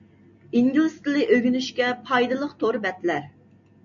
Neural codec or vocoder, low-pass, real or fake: none; 7.2 kHz; real